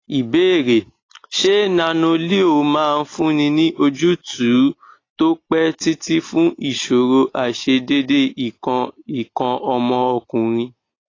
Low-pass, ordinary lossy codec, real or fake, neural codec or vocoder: 7.2 kHz; AAC, 32 kbps; real; none